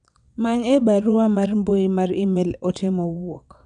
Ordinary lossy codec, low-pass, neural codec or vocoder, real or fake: none; 9.9 kHz; vocoder, 22.05 kHz, 80 mel bands, WaveNeXt; fake